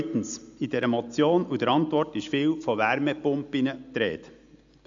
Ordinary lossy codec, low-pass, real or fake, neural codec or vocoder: AAC, 64 kbps; 7.2 kHz; real; none